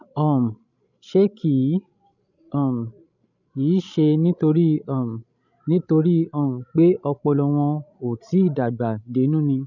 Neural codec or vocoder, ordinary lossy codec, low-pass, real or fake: codec, 16 kHz, 16 kbps, FreqCodec, larger model; none; 7.2 kHz; fake